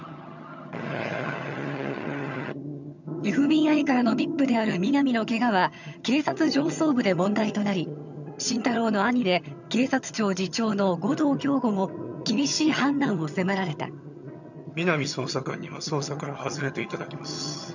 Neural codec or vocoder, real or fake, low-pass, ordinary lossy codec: vocoder, 22.05 kHz, 80 mel bands, HiFi-GAN; fake; 7.2 kHz; none